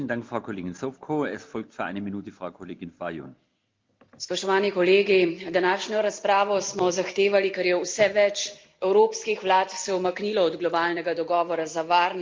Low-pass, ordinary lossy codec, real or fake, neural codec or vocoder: 7.2 kHz; Opus, 24 kbps; real; none